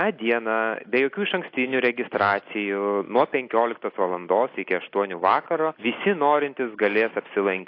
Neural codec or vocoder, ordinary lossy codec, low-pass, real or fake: none; AAC, 32 kbps; 5.4 kHz; real